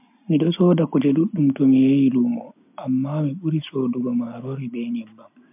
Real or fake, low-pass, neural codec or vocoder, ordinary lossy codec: real; 3.6 kHz; none; MP3, 32 kbps